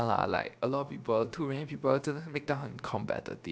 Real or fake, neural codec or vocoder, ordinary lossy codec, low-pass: fake; codec, 16 kHz, about 1 kbps, DyCAST, with the encoder's durations; none; none